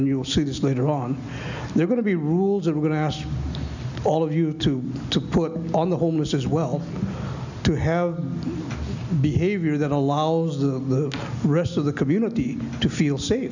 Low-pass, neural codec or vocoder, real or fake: 7.2 kHz; none; real